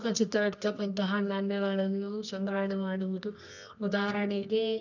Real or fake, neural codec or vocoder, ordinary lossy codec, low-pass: fake; codec, 24 kHz, 0.9 kbps, WavTokenizer, medium music audio release; none; 7.2 kHz